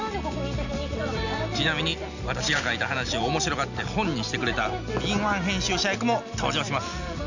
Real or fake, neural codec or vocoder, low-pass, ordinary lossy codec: real; none; 7.2 kHz; none